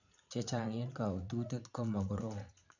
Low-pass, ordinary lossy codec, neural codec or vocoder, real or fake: 7.2 kHz; none; vocoder, 44.1 kHz, 80 mel bands, Vocos; fake